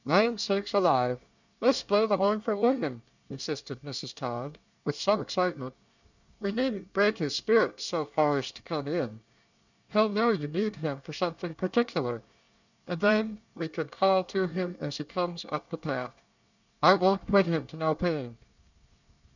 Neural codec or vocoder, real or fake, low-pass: codec, 24 kHz, 1 kbps, SNAC; fake; 7.2 kHz